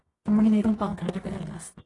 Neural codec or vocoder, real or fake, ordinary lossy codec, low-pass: codec, 24 kHz, 0.9 kbps, WavTokenizer, medium music audio release; fake; AAC, 32 kbps; 10.8 kHz